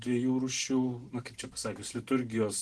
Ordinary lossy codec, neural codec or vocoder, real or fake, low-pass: Opus, 16 kbps; none; real; 10.8 kHz